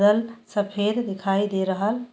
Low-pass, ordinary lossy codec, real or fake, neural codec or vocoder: none; none; real; none